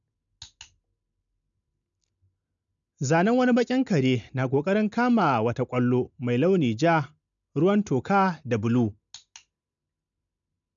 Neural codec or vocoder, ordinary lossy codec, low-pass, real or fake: none; none; 7.2 kHz; real